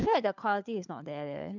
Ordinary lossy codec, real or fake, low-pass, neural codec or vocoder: none; fake; 7.2 kHz; codec, 16 kHz, 4 kbps, FunCodec, trained on LibriTTS, 50 frames a second